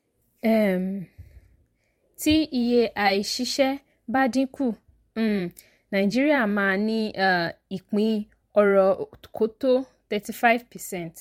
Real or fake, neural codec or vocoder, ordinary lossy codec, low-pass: fake; vocoder, 44.1 kHz, 128 mel bands every 512 samples, BigVGAN v2; MP3, 64 kbps; 19.8 kHz